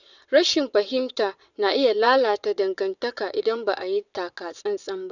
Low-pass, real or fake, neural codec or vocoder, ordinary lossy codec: 7.2 kHz; fake; vocoder, 22.05 kHz, 80 mel bands, WaveNeXt; none